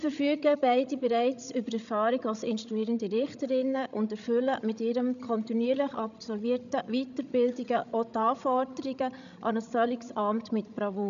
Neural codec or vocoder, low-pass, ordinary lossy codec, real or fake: codec, 16 kHz, 16 kbps, FreqCodec, larger model; 7.2 kHz; none; fake